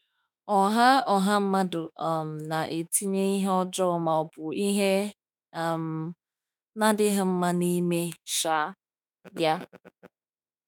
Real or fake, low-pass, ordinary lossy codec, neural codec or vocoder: fake; none; none; autoencoder, 48 kHz, 32 numbers a frame, DAC-VAE, trained on Japanese speech